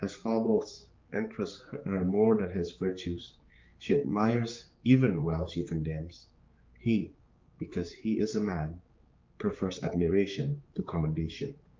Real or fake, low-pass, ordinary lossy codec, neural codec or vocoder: fake; 7.2 kHz; Opus, 24 kbps; codec, 16 kHz, 4 kbps, X-Codec, HuBERT features, trained on general audio